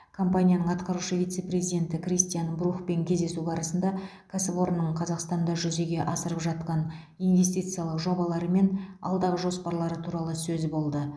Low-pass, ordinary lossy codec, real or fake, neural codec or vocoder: none; none; real; none